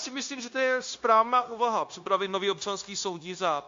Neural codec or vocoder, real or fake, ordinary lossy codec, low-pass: codec, 16 kHz, 0.9 kbps, LongCat-Audio-Codec; fake; AAC, 48 kbps; 7.2 kHz